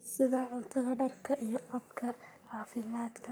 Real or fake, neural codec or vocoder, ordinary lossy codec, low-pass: fake; codec, 44.1 kHz, 3.4 kbps, Pupu-Codec; none; none